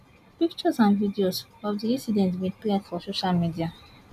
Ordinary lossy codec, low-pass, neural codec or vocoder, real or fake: none; 14.4 kHz; none; real